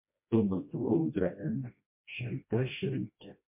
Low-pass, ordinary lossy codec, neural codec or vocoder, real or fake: 3.6 kHz; MP3, 24 kbps; codec, 16 kHz, 1 kbps, FreqCodec, smaller model; fake